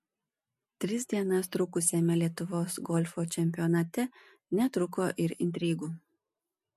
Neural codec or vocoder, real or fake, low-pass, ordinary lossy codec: none; real; 14.4 kHz; MP3, 64 kbps